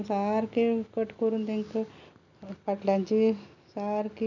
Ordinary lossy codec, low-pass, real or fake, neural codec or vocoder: none; 7.2 kHz; real; none